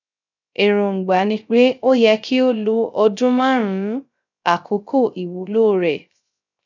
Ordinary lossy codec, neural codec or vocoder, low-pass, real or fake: none; codec, 16 kHz, 0.3 kbps, FocalCodec; 7.2 kHz; fake